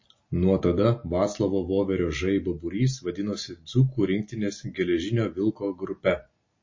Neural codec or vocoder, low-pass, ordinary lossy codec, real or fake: none; 7.2 kHz; MP3, 32 kbps; real